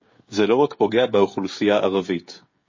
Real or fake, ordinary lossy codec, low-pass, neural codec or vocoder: fake; MP3, 32 kbps; 7.2 kHz; codec, 16 kHz, 16 kbps, FreqCodec, smaller model